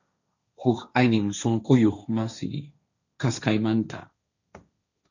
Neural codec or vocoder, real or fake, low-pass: codec, 16 kHz, 1.1 kbps, Voila-Tokenizer; fake; 7.2 kHz